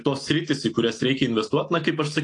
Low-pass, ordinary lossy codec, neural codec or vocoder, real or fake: 10.8 kHz; AAC, 48 kbps; none; real